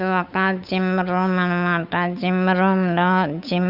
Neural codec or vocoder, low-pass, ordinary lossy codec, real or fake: codec, 16 kHz, 8 kbps, FunCodec, trained on Chinese and English, 25 frames a second; 5.4 kHz; none; fake